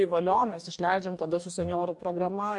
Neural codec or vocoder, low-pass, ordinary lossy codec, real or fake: codec, 44.1 kHz, 2.6 kbps, DAC; 10.8 kHz; MP3, 64 kbps; fake